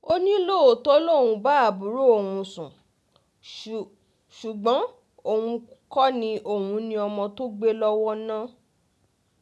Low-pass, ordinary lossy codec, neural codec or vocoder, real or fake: none; none; none; real